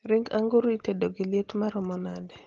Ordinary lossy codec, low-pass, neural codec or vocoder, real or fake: Opus, 16 kbps; 7.2 kHz; none; real